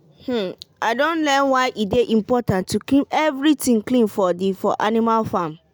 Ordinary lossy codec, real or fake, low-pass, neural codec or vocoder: none; real; none; none